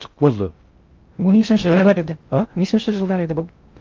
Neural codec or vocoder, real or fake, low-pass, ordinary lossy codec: codec, 16 kHz in and 24 kHz out, 0.6 kbps, FocalCodec, streaming, 2048 codes; fake; 7.2 kHz; Opus, 32 kbps